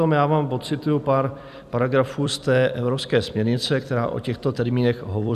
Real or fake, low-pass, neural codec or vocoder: fake; 14.4 kHz; vocoder, 44.1 kHz, 128 mel bands every 256 samples, BigVGAN v2